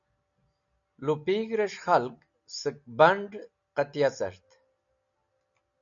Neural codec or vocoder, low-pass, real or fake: none; 7.2 kHz; real